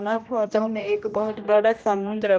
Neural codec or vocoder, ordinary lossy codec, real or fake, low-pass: codec, 16 kHz, 1 kbps, X-Codec, HuBERT features, trained on general audio; none; fake; none